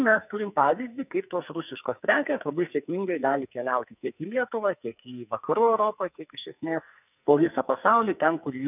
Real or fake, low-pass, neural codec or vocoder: fake; 3.6 kHz; codec, 44.1 kHz, 2.6 kbps, SNAC